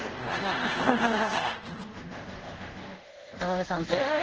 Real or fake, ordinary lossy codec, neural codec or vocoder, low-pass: fake; Opus, 16 kbps; codec, 24 kHz, 0.5 kbps, DualCodec; 7.2 kHz